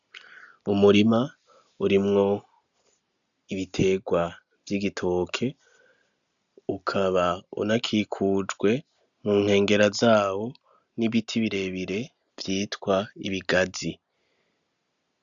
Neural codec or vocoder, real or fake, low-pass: none; real; 7.2 kHz